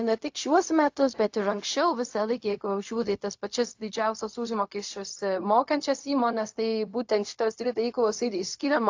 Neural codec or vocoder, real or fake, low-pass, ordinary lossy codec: codec, 16 kHz, 0.4 kbps, LongCat-Audio-Codec; fake; 7.2 kHz; AAC, 48 kbps